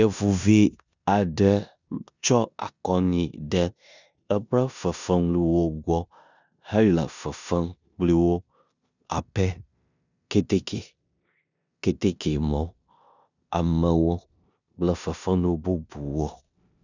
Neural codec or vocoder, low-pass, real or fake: codec, 16 kHz in and 24 kHz out, 0.9 kbps, LongCat-Audio-Codec, four codebook decoder; 7.2 kHz; fake